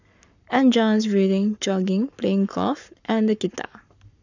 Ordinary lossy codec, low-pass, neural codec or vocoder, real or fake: none; 7.2 kHz; codec, 44.1 kHz, 7.8 kbps, Pupu-Codec; fake